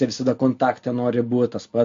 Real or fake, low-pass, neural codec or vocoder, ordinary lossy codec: real; 7.2 kHz; none; MP3, 48 kbps